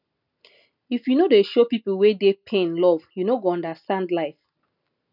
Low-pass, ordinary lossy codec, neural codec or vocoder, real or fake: 5.4 kHz; none; none; real